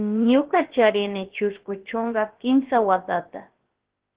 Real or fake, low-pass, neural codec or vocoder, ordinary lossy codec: fake; 3.6 kHz; codec, 16 kHz, about 1 kbps, DyCAST, with the encoder's durations; Opus, 16 kbps